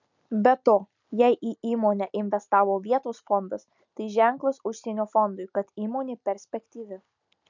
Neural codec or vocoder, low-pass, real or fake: none; 7.2 kHz; real